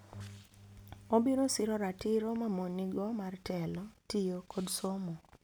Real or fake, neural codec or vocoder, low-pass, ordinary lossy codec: real; none; none; none